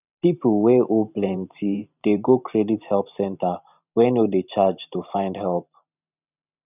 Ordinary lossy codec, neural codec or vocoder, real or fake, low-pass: none; vocoder, 44.1 kHz, 128 mel bands every 512 samples, BigVGAN v2; fake; 3.6 kHz